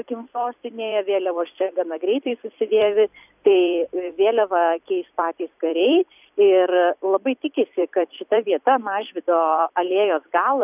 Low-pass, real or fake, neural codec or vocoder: 3.6 kHz; fake; vocoder, 44.1 kHz, 128 mel bands every 256 samples, BigVGAN v2